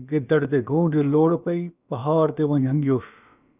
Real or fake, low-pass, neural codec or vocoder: fake; 3.6 kHz; codec, 16 kHz, about 1 kbps, DyCAST, with the encoder's durations